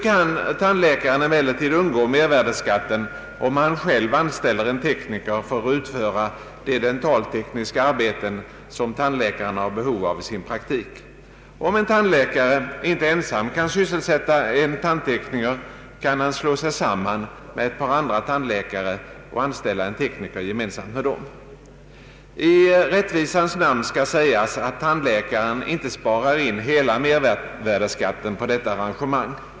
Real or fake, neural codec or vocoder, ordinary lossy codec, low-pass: real; none; none; none